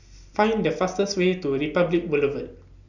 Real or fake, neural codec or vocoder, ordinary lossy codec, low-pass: real; none; none; 7.2 kHz